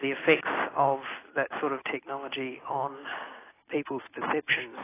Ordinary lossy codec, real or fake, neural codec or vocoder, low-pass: AAC, 16 kbps; real; none; 3.6 kHz